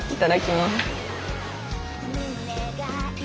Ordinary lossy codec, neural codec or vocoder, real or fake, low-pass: none; none; real; none